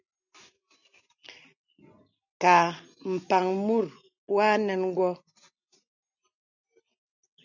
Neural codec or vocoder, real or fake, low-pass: none; real; 7.2 kHz